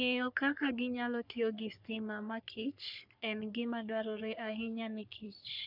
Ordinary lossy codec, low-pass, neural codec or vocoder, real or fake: none; 5.4 kHz; codec, 44.1 kHz, 3.4 kbps, Pupu-Codec; fake